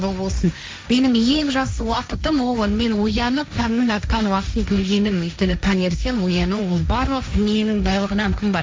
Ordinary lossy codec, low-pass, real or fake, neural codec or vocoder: none; none; fake; codec, 16 kHz, 1.1 kbps, Voila-Tokenizer